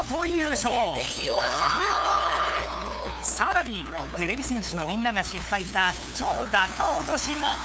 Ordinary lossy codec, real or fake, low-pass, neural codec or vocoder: none; fake; none; codec, 16 kHz, 2 kbps, FunCodec, trained on LibriTTS, 25 frames a second